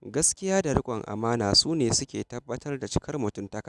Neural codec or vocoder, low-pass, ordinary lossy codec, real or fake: none; none; none; real